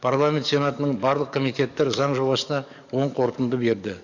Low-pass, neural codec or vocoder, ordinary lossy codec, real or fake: 7.2 kHz; codec, 44.1 kHz, 7.8 kbps, DAC; none; fake